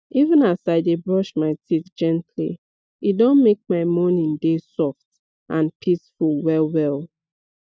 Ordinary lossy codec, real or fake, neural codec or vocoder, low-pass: none; real; none; none